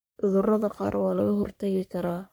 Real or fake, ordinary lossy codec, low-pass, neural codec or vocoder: fake; none; none; codec, 44.1 kHz, 3.4 kbps, Pupu-Codec